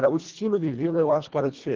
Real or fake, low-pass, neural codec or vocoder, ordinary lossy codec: fake; 7.2 kHz; codec, 24 kHz, 1.5 kbps, HILCodec; Opus, 16 kbps